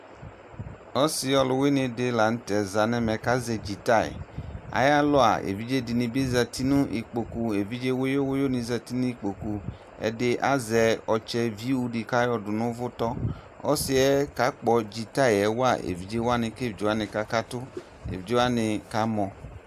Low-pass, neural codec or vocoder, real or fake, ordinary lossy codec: 14.4 kHz; none; real; AAC, 96 kbps